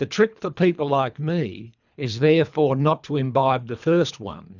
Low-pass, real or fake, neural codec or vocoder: 7.2 kHz; fake; codec, 24 kHz, 3 kbps, HILCodec